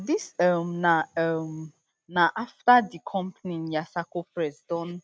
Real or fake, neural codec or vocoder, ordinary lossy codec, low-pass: real; none; none; none